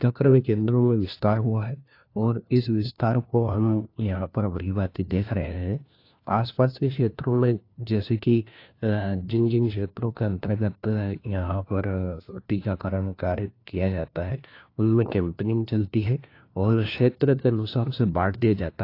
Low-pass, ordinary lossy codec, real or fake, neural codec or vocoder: 5.4 kHz; AAC, 32 kbps; fake; codec, 16 kHz, 1 kbps, FunCodec, trained on LibriTTS, 50 frames a second